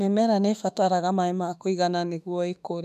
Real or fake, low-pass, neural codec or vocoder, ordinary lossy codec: fake; 14.4 kHz; autoencoder, 48 kHz, 32 numbers a frame, DAC-VAE, trained on Japanese speech; none